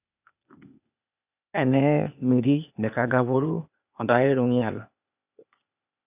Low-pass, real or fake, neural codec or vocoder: 3.6 kHz; fake; codec, 16 kHz, 0.8 kbps, ZipCodec